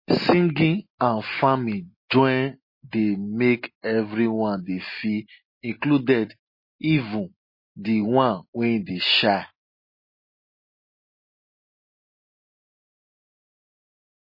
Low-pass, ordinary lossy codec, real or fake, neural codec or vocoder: 5.4 kHz; MP3, 24 kbps; real; none